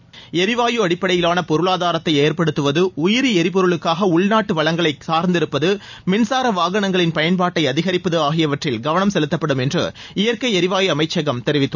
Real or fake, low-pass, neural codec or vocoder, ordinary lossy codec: real; 7.2 kHz; none; none